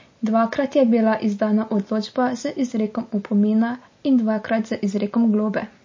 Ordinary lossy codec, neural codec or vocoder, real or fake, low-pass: MP3, 32 kbps; none; real; 7.2 kHz